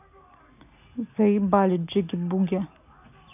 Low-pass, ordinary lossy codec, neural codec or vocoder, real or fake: 3.6 kHz; none; none; real